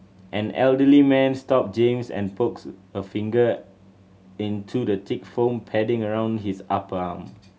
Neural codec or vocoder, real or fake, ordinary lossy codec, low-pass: none; real; none; none